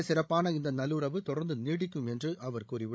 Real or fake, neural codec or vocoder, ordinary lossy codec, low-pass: fake; codec, 16 kHz, 16 kbps, FreqCodec, larger model; none; none